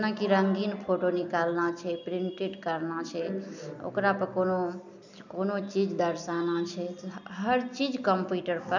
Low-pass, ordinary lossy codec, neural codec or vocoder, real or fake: 7.2 kHz; none; none; real